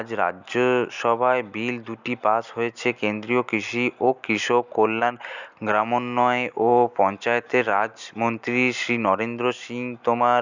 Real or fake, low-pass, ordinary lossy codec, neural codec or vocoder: real; 7.2 kHz; none; none